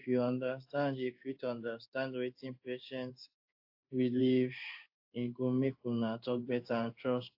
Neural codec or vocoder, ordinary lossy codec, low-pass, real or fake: codec, 16 kHz in and 24 kHz out, 1 kbps, XY-Tokenizer; AAC, 48 kbps; 5.4 kHz; fake